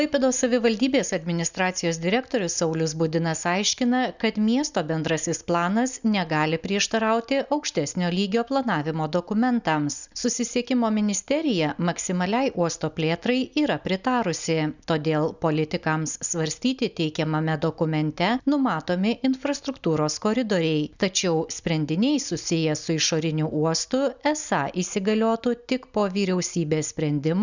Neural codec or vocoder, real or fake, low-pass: none; real; 7.2 kHz